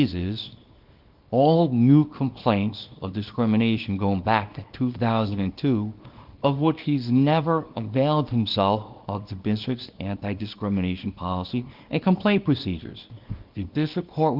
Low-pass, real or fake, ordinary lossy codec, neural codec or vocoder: 5.4 kHz; fake; Opus, 32 kbps; codec, 24 kHz, 0.9 kbps, WavTokenizer, small release